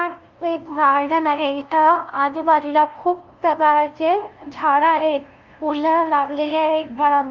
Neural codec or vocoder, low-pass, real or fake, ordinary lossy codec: codec, 16 kHz, 0.5 kbps, FunCodec, trained on LibriTTS, 25 frames a second; 7.2 kHz; fake; Opus, 32 kbps